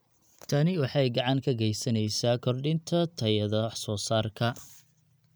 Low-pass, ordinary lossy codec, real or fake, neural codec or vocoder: none; none; real; none